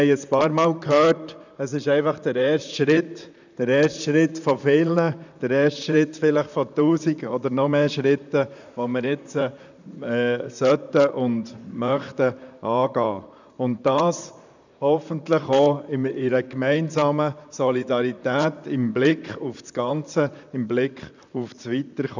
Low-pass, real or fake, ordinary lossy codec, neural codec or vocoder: 7.2 kHz; fake; none; vocoder, 44.1 kHz, 128 mel bands, Pupu-Vocoder